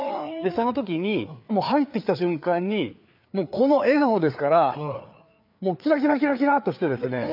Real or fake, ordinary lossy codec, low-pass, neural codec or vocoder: fake; none; 5.4 kHz; codec, 16 kHz, 4 kbps, FreqCodec, larger model